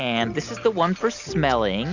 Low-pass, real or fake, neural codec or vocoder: 7.2 kHz; real; none